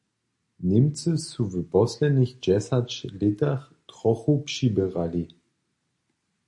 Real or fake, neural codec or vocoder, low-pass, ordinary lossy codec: real; none; 10.8 kHz; MP3, 48 kbps